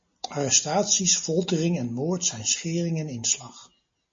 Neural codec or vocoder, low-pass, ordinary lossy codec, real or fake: none; 7.2 kHz; MP3, 32 kbps; real